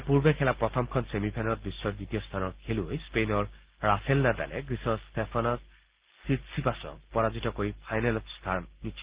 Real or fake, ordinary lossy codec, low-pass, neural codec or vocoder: real; Opus, 16 kbps; 3.6 kHz; none